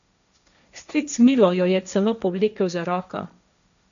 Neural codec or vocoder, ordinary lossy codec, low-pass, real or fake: codec, 16 kHz, 1.1 kbps, Voila-Tokenizer; none; 7.2 kHz; fake